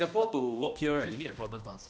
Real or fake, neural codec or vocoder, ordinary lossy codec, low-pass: fake; codec, 16 kHz, 1 kbps, X-Codec, HuBERT features, trained on balanced general audio; none; none